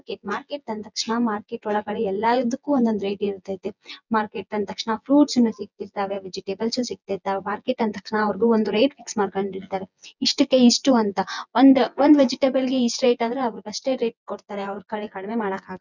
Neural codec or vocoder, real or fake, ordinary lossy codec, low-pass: vocoder, 24 kHz, 100 mel bands, Vocos; fake; none; 7.2 kHz